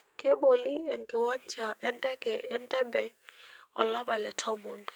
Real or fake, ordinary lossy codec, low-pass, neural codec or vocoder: fake; none; none; codec, 44.1 kHz, 2.6 kbps, SNAC